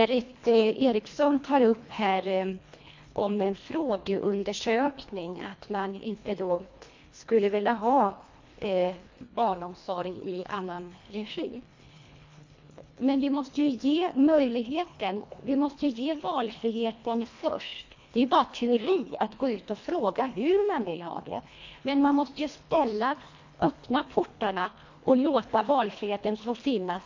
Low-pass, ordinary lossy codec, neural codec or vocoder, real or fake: 7.2 kHz; MP3, 48 kbps; codec, 24 kHz, 1.5 kbps, HILCodec; fake